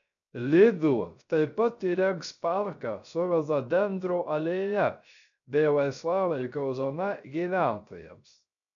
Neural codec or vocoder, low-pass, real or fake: codec, 16 kHz, 0.3 kbps, FocalCodec; 7.2 kHz; fake